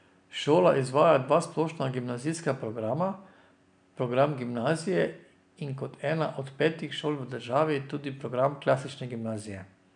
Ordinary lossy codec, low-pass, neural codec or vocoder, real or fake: none; 9.9 kHz; none; real